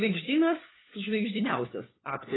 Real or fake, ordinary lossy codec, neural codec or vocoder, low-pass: fake; AAC, 16 kbps; codec, 16 kHz, 4 kbps, FunCodec, trained on LibriTTS, 50 frames a second; 7.2 kHz